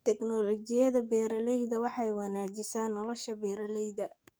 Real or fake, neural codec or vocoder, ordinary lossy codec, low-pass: fake; codec, 44.1 kHz, 7.8 kbps, DAC; none; none